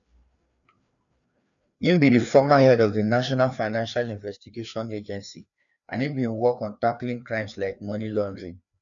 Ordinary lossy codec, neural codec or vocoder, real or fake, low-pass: none; codec, 16 kHz, 2 kbps, FreqCodec, larger model; fake; 7.2 kHz